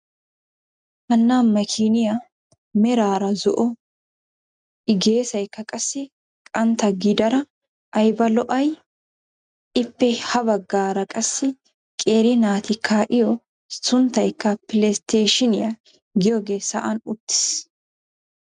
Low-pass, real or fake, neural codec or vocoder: 9.9 kHz; real; none